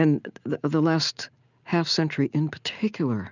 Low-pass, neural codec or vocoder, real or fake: 7.2 kHz; none; real